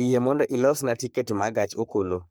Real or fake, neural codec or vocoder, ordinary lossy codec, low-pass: fake; codec, 44.1 kHz, 3.4 kbps, Pupu-Codec; none; none